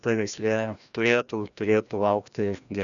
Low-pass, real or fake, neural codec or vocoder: 7.2 kHz; fake; codec, 16 kHz, 1 kbps, FreqCodec, larger model